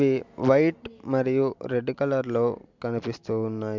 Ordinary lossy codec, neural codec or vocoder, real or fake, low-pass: MP3, 64 kbps; none; real; 7.2 kHz